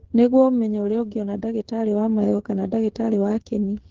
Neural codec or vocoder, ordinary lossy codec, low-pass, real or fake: codec, 16 kHz, 8 kbps, FreqCodec, smaller model; Opus, 16 kbps; 7.2 kHz; fake